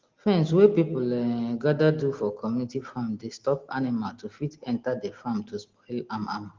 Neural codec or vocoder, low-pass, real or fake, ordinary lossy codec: none; 7.2 kHz; real; Opus, 16 kbps